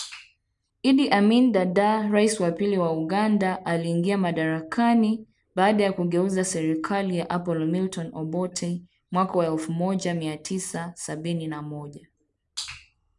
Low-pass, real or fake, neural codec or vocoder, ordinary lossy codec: 10.8 kHz; real; none; none